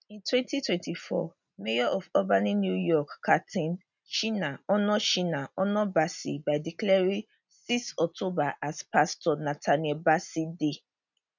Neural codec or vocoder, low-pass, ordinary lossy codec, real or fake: none; 7.2 kHz; none; real